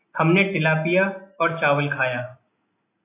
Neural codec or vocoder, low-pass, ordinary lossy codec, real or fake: none; 3.6 kHz; AAC, 24 kbps; real